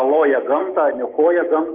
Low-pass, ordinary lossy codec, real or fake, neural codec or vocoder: 3.6 kHz; Opus, 32 kbps; fake; autoencoder, 48 kHz, 128 numbers a frame, DAC-VAE, trained on Japanese speech